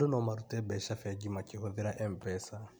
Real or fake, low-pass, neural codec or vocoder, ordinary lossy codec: real; none; none; none